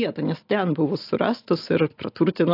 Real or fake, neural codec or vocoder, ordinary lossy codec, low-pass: real; none; AAC, 32 kbps; 5.4 kHz